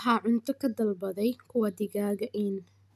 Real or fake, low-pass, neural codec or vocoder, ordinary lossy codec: real; 14.4 kHz; none; none